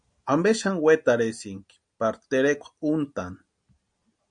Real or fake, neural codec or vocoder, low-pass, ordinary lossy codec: real; none; 9.9 kHz; MP3, 64 kbps